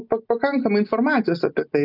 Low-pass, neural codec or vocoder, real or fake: 5.4 kHz; none; real